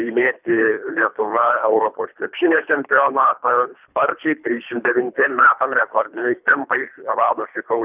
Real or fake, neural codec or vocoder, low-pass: fake; codec, 24 kHz, 3 kbps, HILCodec; 3.6 kHz